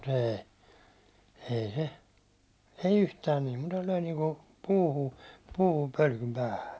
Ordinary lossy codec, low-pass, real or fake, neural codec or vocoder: none; none; real; none